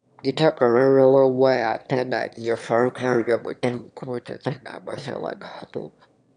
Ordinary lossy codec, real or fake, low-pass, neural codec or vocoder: none; fake; 9.9 kHz; autoencoder, 22.05 kHz, a latent of 192 numbers a frame, VITS, trained on one speaker